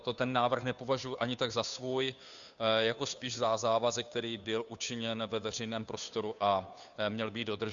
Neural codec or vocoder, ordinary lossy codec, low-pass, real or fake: codec, 16 kHz, 2 kbps, FunCodec, trained on Chinese and English, 25 frames a second; Opus, 64 kbps; 7.2 kHz; fake